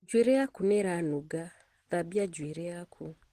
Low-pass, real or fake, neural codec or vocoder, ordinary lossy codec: 14.4 kHz; real; none; Opus, 16 kbps